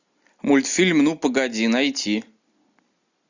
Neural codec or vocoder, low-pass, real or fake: none; 7.2 kHz; real